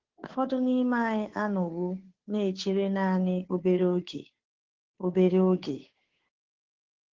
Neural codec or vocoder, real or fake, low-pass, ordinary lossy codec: codec, 16 kHz, 2 kbps, FunCodec, trained on Chinese and English, 25 frames a second; fake; 7.2 kHz; Opus, 16 kbps